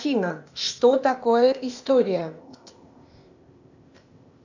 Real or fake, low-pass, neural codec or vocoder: fake; 7.2 kHz; codec, 16 kHz, 0.8 kbps, ZipCodec